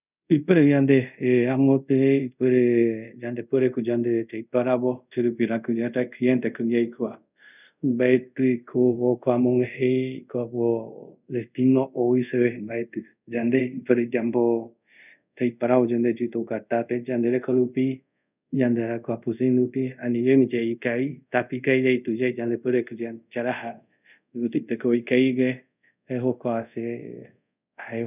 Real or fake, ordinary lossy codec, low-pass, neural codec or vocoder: fake; none; 3.6 kHz; codec, 24 kHz, 0.5 kbps, DualCodec